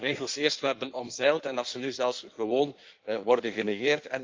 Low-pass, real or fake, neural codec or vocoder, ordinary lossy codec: 7.2 kHz; fake; codec, 16 kHz in and 24 kHz out, 1.1 kbps, FireRedTTS-2 codec; Opus, 32 kbps